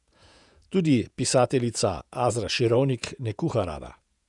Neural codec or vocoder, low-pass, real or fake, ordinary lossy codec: vocoder, 48 kHz, 128 mel bands, Vocos; 10.8 kHz; fake; none